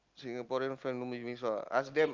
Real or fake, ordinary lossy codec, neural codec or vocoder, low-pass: real; Opus, 24 kbps; none; 7.2 kHz